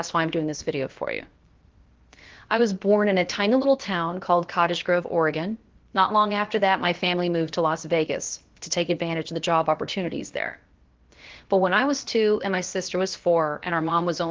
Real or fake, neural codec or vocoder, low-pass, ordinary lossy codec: fake; codec, 16 kHz, about 1 kbps, DyCAST, with the encoder's durations; 7.2 kHz; Opus, 16 kbps